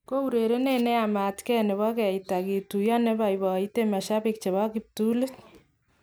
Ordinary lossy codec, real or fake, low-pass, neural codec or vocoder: none; real; none; none